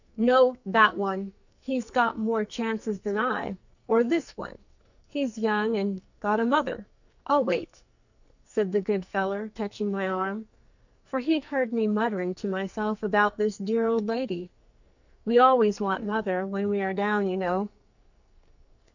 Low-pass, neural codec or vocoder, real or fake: 7.2 kHz; codec, 44.1 kHz, 2.6 kbps, SNAC; fake